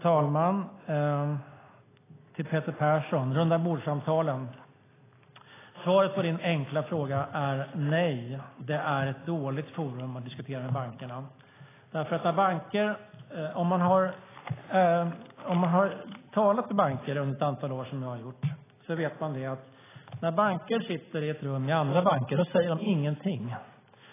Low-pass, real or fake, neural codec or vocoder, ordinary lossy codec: 3.6 kHz; real; none; AAC, 16 kbps